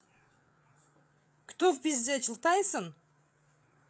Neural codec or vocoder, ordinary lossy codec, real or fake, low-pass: codec, 16 kHz, 8 kbps, FreqCodec, larger model; none; fake; none